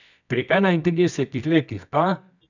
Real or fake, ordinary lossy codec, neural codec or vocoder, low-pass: fake; none; codec, 24 kHz, 0.9 kbps, WavTokenizer, medium music audio release; 7.2 kHz